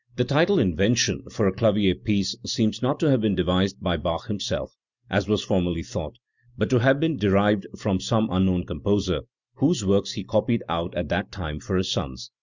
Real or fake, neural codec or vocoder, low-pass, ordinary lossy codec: real; none; 7.2 kHz; Opus, 64 kbps